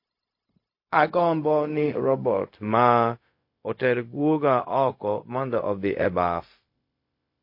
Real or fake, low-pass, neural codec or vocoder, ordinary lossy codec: fake; 5.4 kHz; codec, 16 kHz, 0.4 kbps, LongCat-Audio-Codec; MP3, 32 kbps